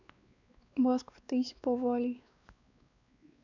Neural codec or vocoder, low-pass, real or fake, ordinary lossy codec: codec, 16 kHz, 2 kbps, X-Codec, WavLM features, trained on Multilingual LibriSpeech; 7.2 kHz; fake; none